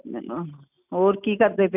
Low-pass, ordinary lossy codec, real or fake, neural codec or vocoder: 3.6 kHz; none; real; none